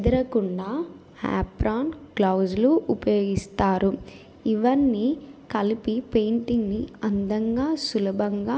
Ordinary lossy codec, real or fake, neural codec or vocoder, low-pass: none; real; none; none